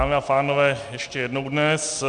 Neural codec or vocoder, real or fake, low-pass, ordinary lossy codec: none; real; 9.9 kHz; MP3, 96 kbps